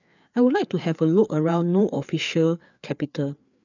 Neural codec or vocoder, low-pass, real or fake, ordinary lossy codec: codec, 16 kHz, 4 kbps, FreqCodec, larger model; 7.2 kHz; fake; none